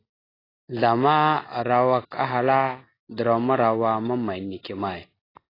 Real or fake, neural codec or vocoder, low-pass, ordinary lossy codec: real; none; 5.4 kHz; AAC, 24 kbps